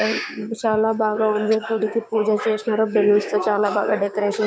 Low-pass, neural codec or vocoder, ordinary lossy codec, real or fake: none; codec, 16 kHz, 6 kbps, DAC; none; fake